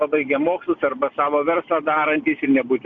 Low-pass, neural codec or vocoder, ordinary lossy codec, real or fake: 7.2 kHz; none; AAC, 64 kbps; real